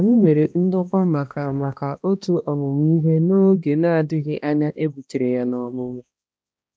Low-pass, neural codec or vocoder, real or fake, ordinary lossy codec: none; codec, 16 kHz, 1 kbps, X-Codec, HuBERT features, trained on balanced general audio; fake; none